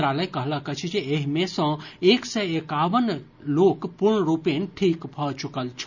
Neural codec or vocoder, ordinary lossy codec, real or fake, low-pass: none; none; real; 7.2 kHz